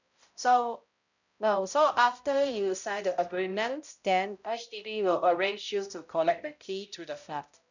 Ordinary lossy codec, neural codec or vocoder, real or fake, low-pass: none; codec, 16 kHz, 0.5 kbps, X-Codec, HuBERT features, trained on balanced general audio; fake; 7.2 kHz